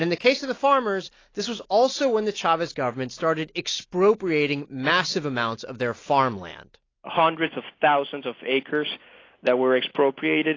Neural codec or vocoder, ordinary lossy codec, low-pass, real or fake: none; AAC, 32 kbps; 7.2 kHz; real